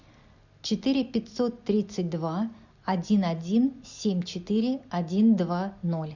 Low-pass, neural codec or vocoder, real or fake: 7.2 kHz; none; real